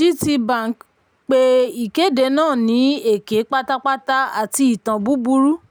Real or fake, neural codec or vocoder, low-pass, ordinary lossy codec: real; none; none; none